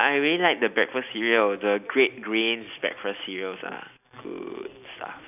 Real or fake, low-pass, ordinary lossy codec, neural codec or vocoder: real; 3.6 kHz; none; none